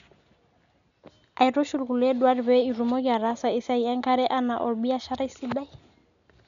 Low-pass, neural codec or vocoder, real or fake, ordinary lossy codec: 7.2 kHz; none; real; none